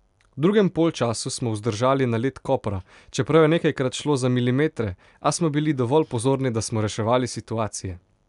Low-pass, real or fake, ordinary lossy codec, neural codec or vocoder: 10.8 kHz; real; none; none